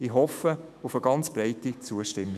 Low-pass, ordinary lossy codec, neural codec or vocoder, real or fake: 14.4 kHz; none; autoencoder, 48 kHz, 128 numbers a frame, DAC-VAE, trained on Japanese speech; fake